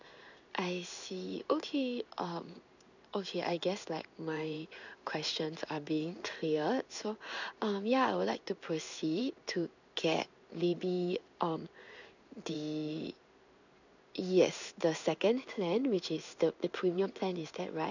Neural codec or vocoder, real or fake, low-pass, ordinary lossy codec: codec, 16 kHz in and 24 kHz out, 1 kbps, XY-Tokenizer; fake; 7.2 kHz; none